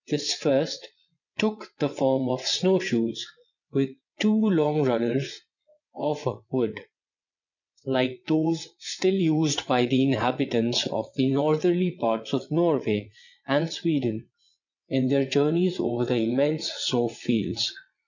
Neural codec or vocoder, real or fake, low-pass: vocoder, 22.05 kHz, 80 mel bands, WaveNeXt; fake; 7.2 kHz